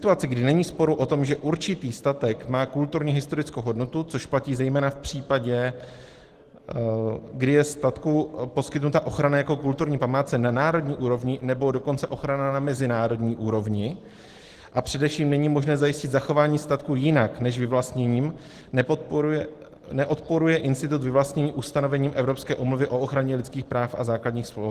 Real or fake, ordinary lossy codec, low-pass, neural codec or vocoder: real; Opus, 16 kbps; 14.4 kHz; none